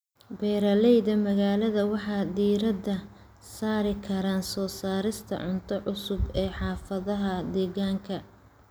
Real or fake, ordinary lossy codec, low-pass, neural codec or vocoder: real; none; none; none